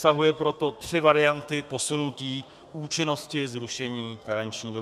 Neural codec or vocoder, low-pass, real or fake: codec, 32 kHz, 1.9 kbps, SNAC; 14.4 kHz; fake